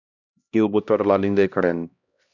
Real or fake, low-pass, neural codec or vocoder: fake; 7.2 kHz; codec, 16 kHz, 1 kbps, X-Codec, HuBERT features, trained on LibriSpeech